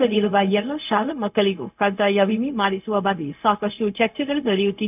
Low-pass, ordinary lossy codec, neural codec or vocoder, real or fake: 3.6 kHz; none; codec, 16 kHz, 0.4 kbps, LongCat-Audio-Codec; fake